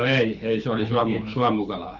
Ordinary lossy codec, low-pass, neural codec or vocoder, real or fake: none; 7.2 kHz; codec, 24 kHz, 6 kbps, HILCodec; fake